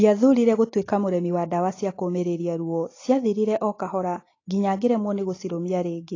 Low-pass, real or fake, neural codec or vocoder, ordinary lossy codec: 7.2 kHz; real; none; AAC, 32 kbps